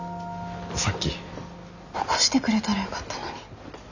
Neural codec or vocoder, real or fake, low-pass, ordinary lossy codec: none; real; 7.2 kHz; none